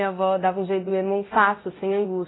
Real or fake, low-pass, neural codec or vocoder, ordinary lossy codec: fake; 7.2 kHz; codec, 24 kHz, 0.9 kbps, DualCodec; AAC, 16 kbps